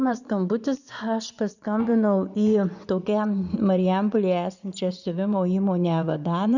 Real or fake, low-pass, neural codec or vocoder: fake; 7.2 kHz; vocoder, 24 kHz, 100 mel bands, Vocos